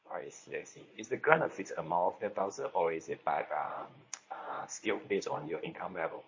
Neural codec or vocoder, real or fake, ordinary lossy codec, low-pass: codec, 24 kHz, 0.9 kbps, WavTokenizer, medium speech release version 1; fake; MP3, 32 kbps; 7.2 kHz